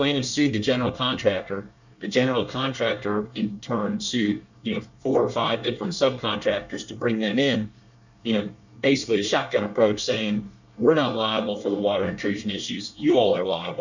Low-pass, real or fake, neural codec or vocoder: 7.2 kHz; fake; codec, 24 kHz, 1 kbps, SNAC